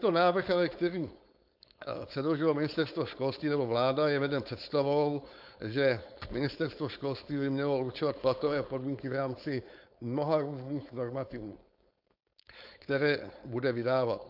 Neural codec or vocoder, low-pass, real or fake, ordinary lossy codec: codec, 16 kHz, 4.8 kbps, FACodec; 5.4 kHz; fake; AAC, 48 kbps